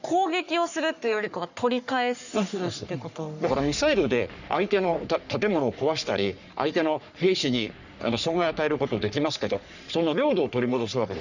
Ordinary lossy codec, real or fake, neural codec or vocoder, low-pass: none; fake; codec, 44.1 kHz, 3.4 kbps, Pupu-Codec; 7.2 kHz